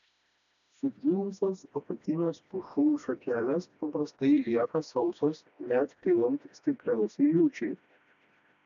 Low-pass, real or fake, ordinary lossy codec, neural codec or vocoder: 7.2 kHz; fake; MP3, 96 kbps; codec, 16 kHz, 1 kbps, FreqCodec, smaller model